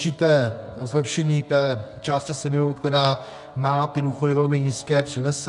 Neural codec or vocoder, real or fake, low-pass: codec, 24 kHz, 0.9 kbps, WavTokenizer, medium music audio release; fake; 10.8 kHz